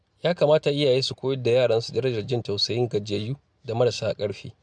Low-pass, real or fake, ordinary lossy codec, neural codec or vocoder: none; real; none; none